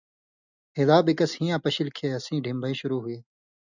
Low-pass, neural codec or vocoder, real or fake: 7.2 kHz; none; real